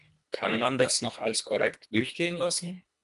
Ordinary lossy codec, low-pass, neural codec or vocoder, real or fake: MP3, 96 kbps; 10.8 kHz; codec, 24 kHz, 1.5 kbps, HILCodec; fake